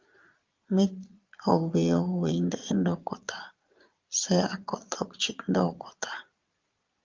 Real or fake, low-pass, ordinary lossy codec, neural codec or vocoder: fake; 7.2 kHz; Opus, 32 kbps; vocoder, 22.05 kHz, 80 mel bands, Vocos